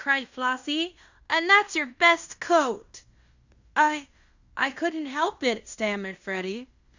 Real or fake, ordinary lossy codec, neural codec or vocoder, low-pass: fake; Opus, 64 kbps; codec, 16 kHz in and 24 kHz out, 0.9 kbps, LongCat-Audio-Codec, fine tuned four codebook decoder; 7.2 kHz